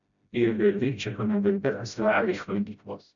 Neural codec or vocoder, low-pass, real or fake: codec, 16 kHz, 0.5 kbps, FreqCodec, smaller model; 7.2 kHz; fake